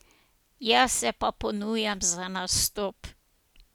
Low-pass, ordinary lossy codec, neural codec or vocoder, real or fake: none; none; none; real